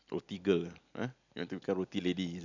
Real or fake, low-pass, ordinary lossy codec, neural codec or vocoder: real; 7.2 kHz; none; none